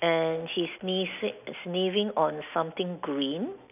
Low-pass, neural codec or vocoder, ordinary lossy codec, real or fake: 3.6 kHz; none; none; real